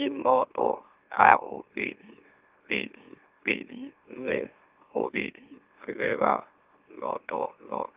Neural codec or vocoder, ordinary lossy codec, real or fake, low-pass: autoencoder, 44.1 kHz, a latent of 192 numbers a frame, MeloTTS; Opus, 24 kbps; fake; 3.6 kHz